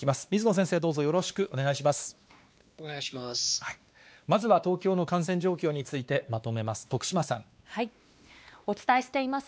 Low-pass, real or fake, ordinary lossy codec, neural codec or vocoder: none; fake; none; codec, 16 kHz, 2 kbps, X-Codec, WavLM features, trained on Multilingual LibriSpeech